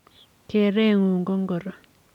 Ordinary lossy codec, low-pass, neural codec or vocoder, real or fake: none; 19.8 kHz; none; real